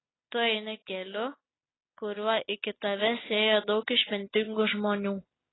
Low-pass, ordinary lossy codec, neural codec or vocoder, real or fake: 7.2 kHz; AAC, 16 kbps; none; real